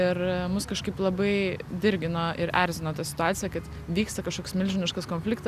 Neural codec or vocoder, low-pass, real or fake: none; 14.4 kHz; real